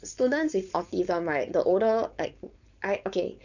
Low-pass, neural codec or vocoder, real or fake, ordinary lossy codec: 7.2 kHz; codec, 16 kHz, 4.8 kbps, FACodec; fake; none